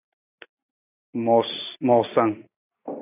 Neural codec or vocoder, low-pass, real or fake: none; 3.6 kHz; real